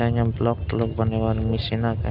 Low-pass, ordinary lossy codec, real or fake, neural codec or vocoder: 5.4 kHz; none; real; none